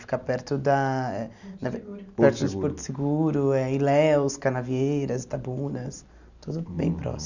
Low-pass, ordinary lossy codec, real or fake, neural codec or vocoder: 7.2 kHz; none; real; none